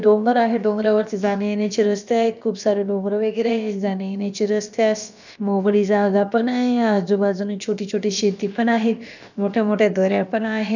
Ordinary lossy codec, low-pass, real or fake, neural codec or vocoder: none; 7.2 kHz; fake; codec, 16 kHz, about 1 kbps, DyCAST, with the encoder's durations